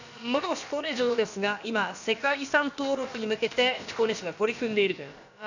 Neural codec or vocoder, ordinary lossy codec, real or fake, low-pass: codec, 16 kHz, about 1 kbps, DyCAST, with the encoder's durations; none; fake; 7.2 kHz